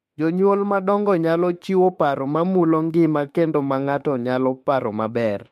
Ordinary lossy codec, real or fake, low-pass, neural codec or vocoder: MP3, 64 kbps; fake; 14.4 kHz; autoencoder, 48 kHz, 32 numbers a frame, DAC-VAE, trained on Japanese speech